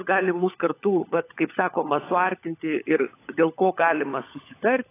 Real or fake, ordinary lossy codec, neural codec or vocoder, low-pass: fake; AAC, 24 kbps; codec, 16 kHz, 16 kbps, FunCodec, trained on LibriTTS, 50 frames a second; 3.6 kHz